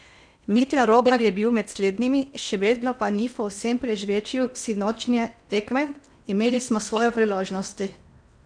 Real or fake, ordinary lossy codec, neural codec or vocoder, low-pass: fake; none; codec, 16 kHz in and 24 kHz out, 0.8 kbps, FocalCodec, streaming, 65536 codes; 9.9 kHz